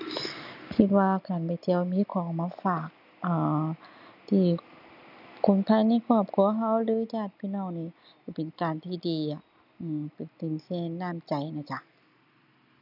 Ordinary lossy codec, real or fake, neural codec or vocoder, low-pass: none; real; none; 5.4 kHz